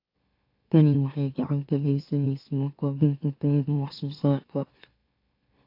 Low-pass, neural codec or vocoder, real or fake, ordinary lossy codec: 5.4 kHz; autoencoder, 44.1 kHz, a latent of 192 numbers a frame, MeloTTS; fake; AAC, 32 kbps